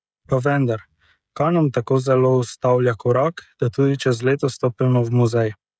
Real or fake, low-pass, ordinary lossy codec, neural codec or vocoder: fake; none; none; codec, 16 kHz, 16 kbps, FreqCodec, smaller model